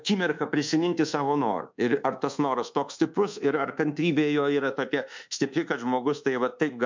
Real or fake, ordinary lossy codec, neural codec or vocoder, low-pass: fake; MP3, 64 kbps; codec, 24 kHz, 1.2 kbps, DualCodec; 7.2 kHz